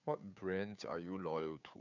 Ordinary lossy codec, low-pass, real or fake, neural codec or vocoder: none; 7.2 kHz; fake; codec, 16 kHz, 2 kbps, FunCodec, trained on Chinese and English, 25 frames a second